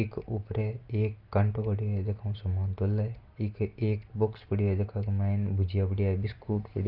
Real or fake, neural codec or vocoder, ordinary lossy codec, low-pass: real; none; Opus, 32 kbps; 5.4 kHz